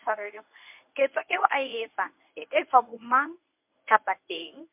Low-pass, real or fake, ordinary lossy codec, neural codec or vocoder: 3.6 kHz; fake; MP3, 32 kbps; codec, 24 kHz, 0.9 kbps, WavTokenizer, medium speech release version 1